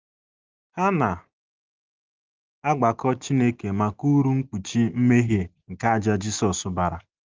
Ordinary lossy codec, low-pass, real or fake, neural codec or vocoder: Opus, 32 kbps; 7.2 kHz; real; none